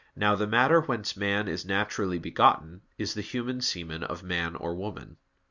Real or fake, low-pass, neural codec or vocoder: real; 7.2 kHz; none